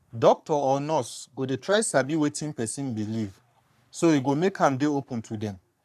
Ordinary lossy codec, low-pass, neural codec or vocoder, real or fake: none; 14.4 kHz; codec, 44.1 kHz, 3.4 kbps, Pupu-Codec; fake